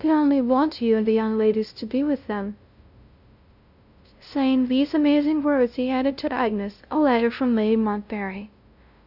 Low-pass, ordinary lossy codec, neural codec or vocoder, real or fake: 5.4 kHz; Opus, 64 kbps; codec, 16 kHz, 0.5 kbps, FunCodec, trained on LibriTTS, 25 frames a second; fake